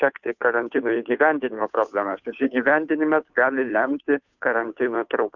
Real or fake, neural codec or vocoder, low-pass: fake; codec, 16 kHz, 2 kbps, FunCodec, trained on Chinese and English, 25 frames a second; 7.2 kHz